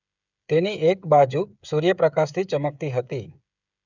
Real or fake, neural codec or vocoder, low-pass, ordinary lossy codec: fake; codec, 16 kHz, 16 kbps, FreqCodec, smaller model; 7.2 kHz; none